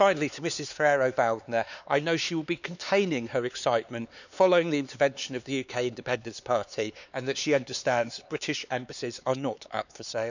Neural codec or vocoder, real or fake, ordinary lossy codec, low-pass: codec, 16 kHz, 4 kbps, X-Codec, HuBERT features, trained on LibriSpeech; fake; none; 7.2 kHz